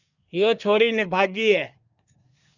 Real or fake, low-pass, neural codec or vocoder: fake; 7.2 kHz; codec, 24 kHz, 1 kbps, SNAC